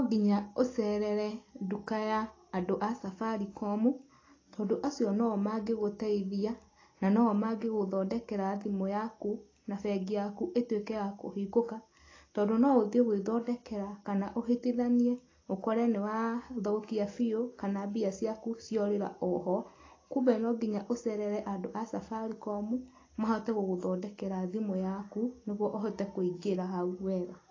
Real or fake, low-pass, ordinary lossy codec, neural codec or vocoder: real; 7.2 kHz; AAC, 32 kbps; none